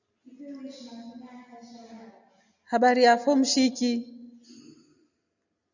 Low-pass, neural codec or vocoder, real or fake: 7.2 kHz; none; real